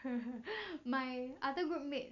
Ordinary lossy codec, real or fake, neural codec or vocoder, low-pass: none; real; none; 7.2 kHz